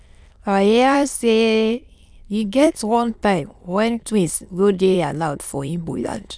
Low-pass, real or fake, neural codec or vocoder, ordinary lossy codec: none; fake; autoencoder, 22.05 kHz, a latent of 192 numbers a frame, VITS, trained on many speakers; none